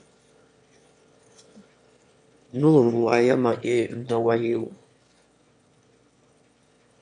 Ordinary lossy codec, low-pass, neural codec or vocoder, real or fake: MP3, 64 kbps; 9.9 kHz; autoencoder, 22.05 kHz, a latent of 192 numbers a frame, VITS, trained on one speaker; fake